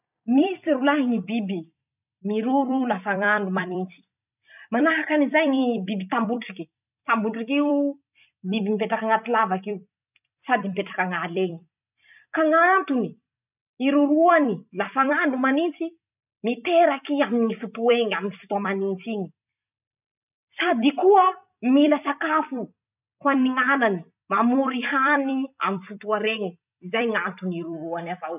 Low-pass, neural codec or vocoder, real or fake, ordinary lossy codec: 3.6 kHz; vocoder, 44.1 kHz, 128 mel bands every 256 samples, BigVGAN v2; fake; none